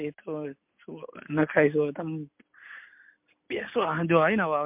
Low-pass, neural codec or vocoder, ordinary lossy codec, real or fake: 3.6 kHz; none; MP3, 32 kbps; real